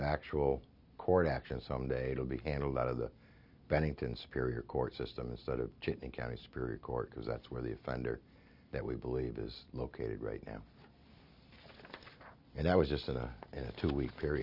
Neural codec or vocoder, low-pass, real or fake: none; 5.4 kHz; real